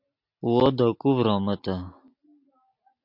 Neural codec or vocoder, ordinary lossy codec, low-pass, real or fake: none; MP3, 48 kbps; 5.4 kHz; real